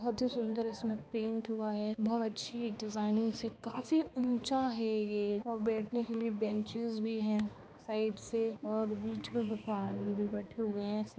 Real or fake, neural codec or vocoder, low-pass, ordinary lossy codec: fake; codec, 16 kHz, 2 kbps, X-Codec, HuBERT features, trained on balanced general audio; none; none